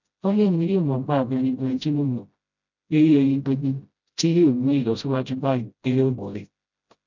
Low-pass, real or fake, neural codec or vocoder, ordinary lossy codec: 7.2 kHz; fake; codec, 16 kHz, 0.5 kbps, FreqCodec, smaller model; none